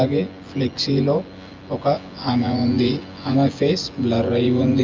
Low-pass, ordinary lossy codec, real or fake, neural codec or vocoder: 7.2 kHz; Opus, 32 kbps; fake; vocoder, 24 kHz, 100 mel bands, Vocos